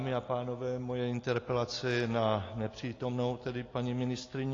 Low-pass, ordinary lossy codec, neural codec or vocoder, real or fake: 7.2 kHz; AAC, 32 kbps; none; real